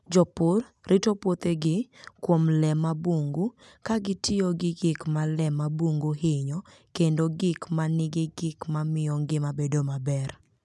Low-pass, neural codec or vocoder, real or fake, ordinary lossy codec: none; none; real; none